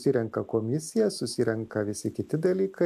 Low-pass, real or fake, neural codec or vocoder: 14.4 kHz; real; none